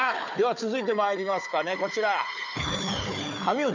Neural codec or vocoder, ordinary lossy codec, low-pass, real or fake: codec, 16 kHz, 4 kbps, FunCodec, trained on Chinese and English, 50 frames a second; none; 7.2 kHz; fake